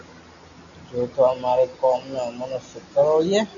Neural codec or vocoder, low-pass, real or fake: none; 7.2 kHz; real